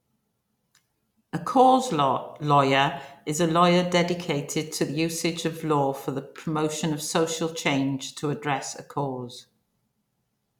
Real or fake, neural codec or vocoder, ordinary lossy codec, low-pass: real; none; none; 19.8 kHz